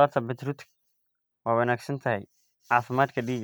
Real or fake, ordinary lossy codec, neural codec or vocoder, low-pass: real; none; none; none